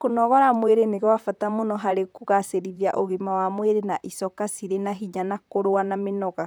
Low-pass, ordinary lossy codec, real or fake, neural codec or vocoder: none; none; fake; vocoder, 44.1 kHz, 128 mel bands, Pupu-Vocoder